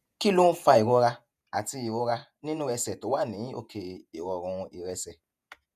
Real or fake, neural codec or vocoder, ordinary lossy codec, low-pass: fake; vocoder, 48 kHz, 128 mel bands, Vocos; none; 14.4 kHz